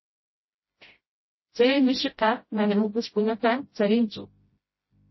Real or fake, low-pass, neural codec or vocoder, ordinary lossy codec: fake; 7.2 kHz; codec, 16 kHz, 0.5 kbps, FreqCodec, smaller model; MP3, 24 kbps